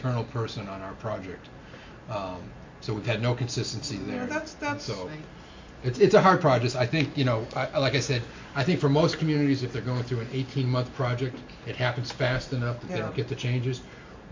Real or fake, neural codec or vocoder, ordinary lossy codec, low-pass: real; none; MP3, 48 kbps; 7.2 kHz